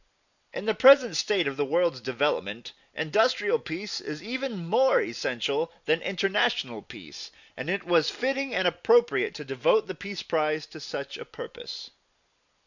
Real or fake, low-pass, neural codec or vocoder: real; 7.2 kHz; none